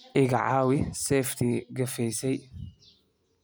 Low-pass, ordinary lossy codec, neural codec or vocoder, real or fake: none; none; none; real